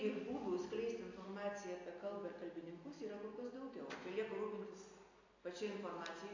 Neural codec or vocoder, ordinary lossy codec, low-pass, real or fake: none; AAC, 48 kbps; 7.2 kHz; real